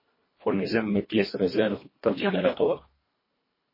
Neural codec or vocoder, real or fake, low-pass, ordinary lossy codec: codec, 24 kHz, 1.5 kbps, HILCodec; fake; 5.4 kHz; MP3, 24 kbps